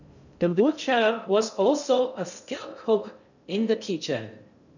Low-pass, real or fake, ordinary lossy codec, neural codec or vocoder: 7.2 kHz; fake; none; codec, 16 kHz in and 24 kHz out, 0.6 kbps, FocalCodec, streaming, 2048 codes